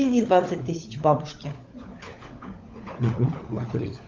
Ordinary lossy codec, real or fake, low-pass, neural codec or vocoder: Opus, 32 kbps; fake; 7.2 kHz; codec, 16 kHz, 16 kbps, FunCodec, trained on LibriTTS, 50 frames a second